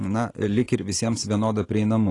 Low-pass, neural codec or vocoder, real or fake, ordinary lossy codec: 10.8 kHz; none; real; AAC, 32 kbps